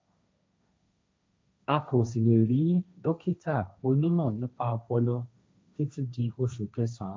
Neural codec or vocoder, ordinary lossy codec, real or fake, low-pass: codec, 16 kHz, 1.1 kbps, Voila-Tokenizer; none; fake; none